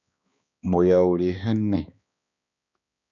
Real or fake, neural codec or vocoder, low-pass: fake; codec, 16 kHz, 2 kbps, X-Codec, HuBERT features, trained on balanced general audio; 7.2 kHz